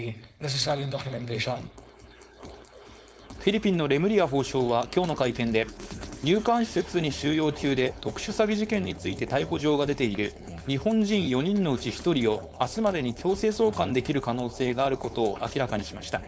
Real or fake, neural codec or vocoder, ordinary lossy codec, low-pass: fake; codec, 16 kHz, 4.8 kbps, FACodec; none; none